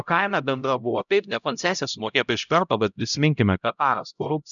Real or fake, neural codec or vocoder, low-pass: fake; codec, 16 kHz, 0.5 kbps, X-Codec, HuBERT features, trained on LibriSpeech; 7.2 kHz